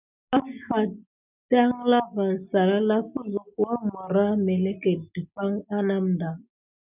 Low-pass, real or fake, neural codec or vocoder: 3.6 kHz; real; none